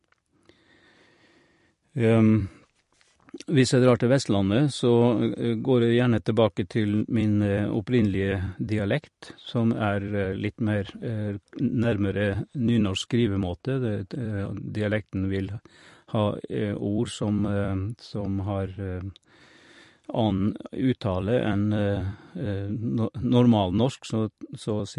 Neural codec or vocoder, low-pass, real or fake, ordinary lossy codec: vocoder, 44.1 kHz, 128 mel bands every 256 samples, BigVGAN v2; 14.4 kHz; fake; MP3, 48 kbps